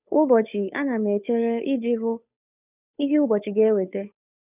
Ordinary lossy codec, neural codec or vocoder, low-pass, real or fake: none; codec, 16 kHz, 2 kbps, FunCodec, trained on Chinese and English, 25 frames a second; 3.6 kHz; fake